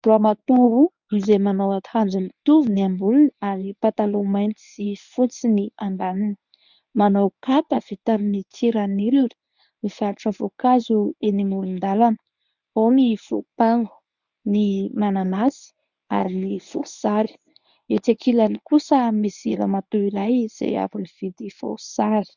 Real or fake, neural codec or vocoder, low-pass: fake; codec, 24 kHz, 0.9 kbps, WavTokenizer, medium speech release version 1; 7.2 kHz